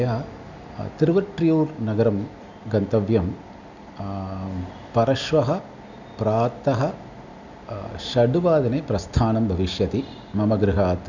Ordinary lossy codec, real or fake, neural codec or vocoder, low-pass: none; real; none; 7.2 kHz